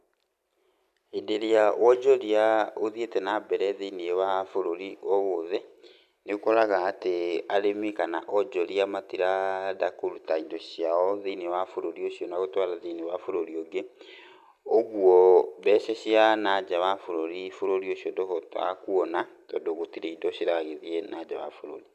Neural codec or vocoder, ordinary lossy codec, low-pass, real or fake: none; none; 14.4 kHz; real